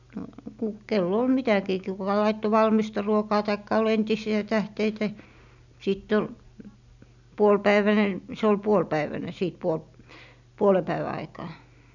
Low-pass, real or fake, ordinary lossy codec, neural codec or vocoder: 7.2 kHz; real; none; none